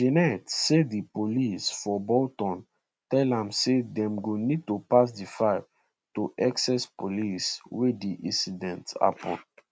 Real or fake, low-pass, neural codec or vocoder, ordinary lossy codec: real; none; none; none